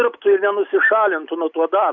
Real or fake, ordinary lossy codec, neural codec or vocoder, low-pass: real; MP3, 48 kbps; none; 7.2 kHz